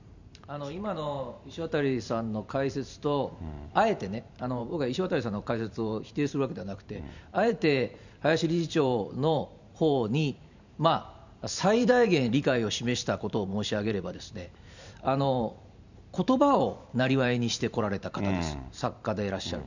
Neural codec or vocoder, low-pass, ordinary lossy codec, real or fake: vocoder, 44.1 kHz, 128 mel bands every 512 samples, BigVGAN v2; 7.2 kHz; none; fake